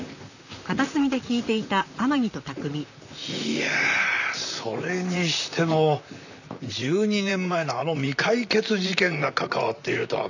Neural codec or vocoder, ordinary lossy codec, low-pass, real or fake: vocoder, 44.1 kHz, 128 mel bands, Pupu-Vocoder; none; 7.2 kHz; fake